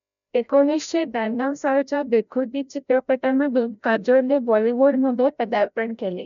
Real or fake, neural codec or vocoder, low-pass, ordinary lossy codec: fake; codec, 16 kHz, 0.5 kbps, FreqCodec, larger model; 7.2 kHz; none